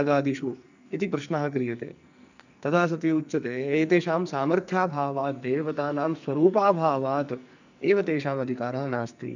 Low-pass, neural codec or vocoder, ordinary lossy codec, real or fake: 7.2 kHz; codec, 44.1 kHz, 2.6 kbps, SNAC; none; fake